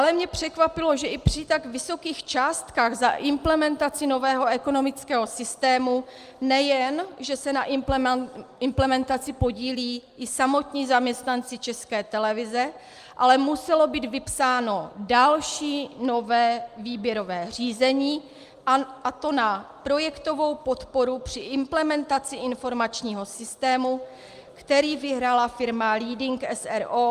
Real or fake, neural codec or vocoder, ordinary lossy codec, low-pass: real; none; Opus, 32 kbps; 14.4 kHz